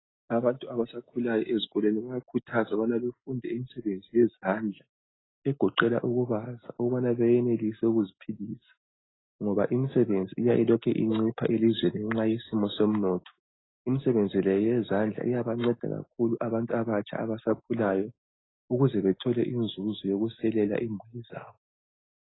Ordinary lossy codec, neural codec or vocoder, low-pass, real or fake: AAC, 16 kbps; none; 7.2 kHz; real